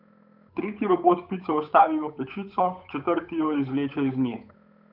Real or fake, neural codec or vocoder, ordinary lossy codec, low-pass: fake; codec, 16 kHz, 8 kbps, FunCodec, trained on Chinese and English, 25 frames a second; none; 5.4 kHz